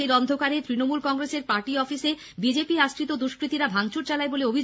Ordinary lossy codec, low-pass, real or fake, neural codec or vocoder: none; none; real; none